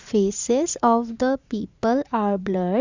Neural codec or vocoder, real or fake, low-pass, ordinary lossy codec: vocoder, 44.1 kHz, 80 mel bands, Vocos; fake; 7.2 kHz; Opus, 64 kbps